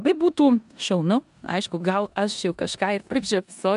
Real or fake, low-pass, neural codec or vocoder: fake; 10.8 kHz; codec, 16 kHz in and 24 kHz out, 0.9 kbps, LongCat-Audio-Codec, four codebook decoder